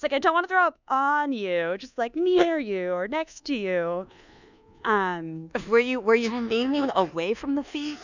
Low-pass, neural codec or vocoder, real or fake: 7.2 kHz; codec, 24 kHz, 1.2 kbps, DualCodec; fake